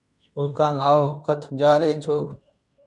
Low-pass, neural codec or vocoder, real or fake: 10.8 kHz; codec, 16 kHz in and 24 kHz out, 0.9 kbps, LongCat-Audio-Codec, fine tuned four codebook decoder; fake